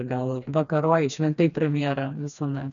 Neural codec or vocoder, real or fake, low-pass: codec, 16 kHz, 2 kbps, FreqCodec, smaller model; fake; 7.2 kHz